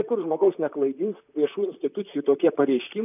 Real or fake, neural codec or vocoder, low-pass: fake; vocoder, 44.1 kHz, 128 mel bands, Pupu-Vocoder; 3.6 kHz